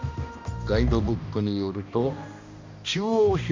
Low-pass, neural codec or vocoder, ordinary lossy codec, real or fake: 7.2 kHz; codec, 16 kHz, 1 kbps, X-Codec, HuBERT features, trained on balanced general audio; MP3, 64 kbps; fake